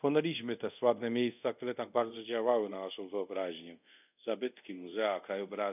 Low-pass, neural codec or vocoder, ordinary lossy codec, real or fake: 3.6 kHz; codec, 24 kHz, 0.5 kbps, DualCodec; none; fake